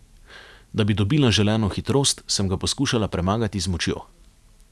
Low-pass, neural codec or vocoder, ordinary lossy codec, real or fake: none; none; none; real